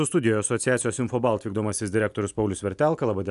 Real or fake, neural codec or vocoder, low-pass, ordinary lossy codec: real; none; 10.8 kHz; AAC, 96 kbps